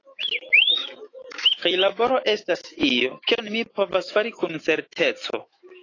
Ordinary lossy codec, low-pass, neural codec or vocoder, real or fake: AAC, 32 kbps; 7.2 kHz; autoencoder, 48 kHz, 128 numbers a frame, DAC-VAE, trained on Japanese speech; fake